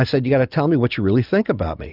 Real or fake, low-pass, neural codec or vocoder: real; 5.4 kHz; none